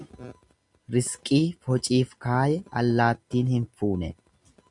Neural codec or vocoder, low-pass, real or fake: none; 10.8 kHz; real